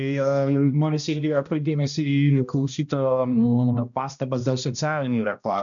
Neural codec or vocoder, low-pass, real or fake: codec, 16 kHz, 1 kbps, X-Codec, HuBERT features, trained on balanced general audio; 7.2 kHz; fake